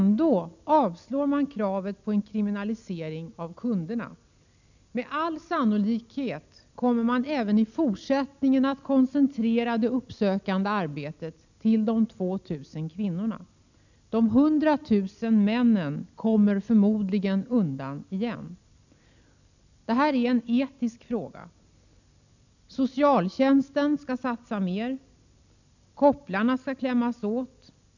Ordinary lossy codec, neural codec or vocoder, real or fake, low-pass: none; none; real; 7.2 kHz